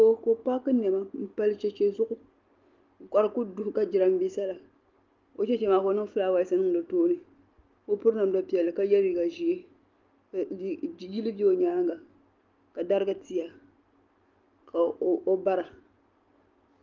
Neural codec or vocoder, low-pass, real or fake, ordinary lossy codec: none; 7.2 kHz; real; Opus, 32 kbps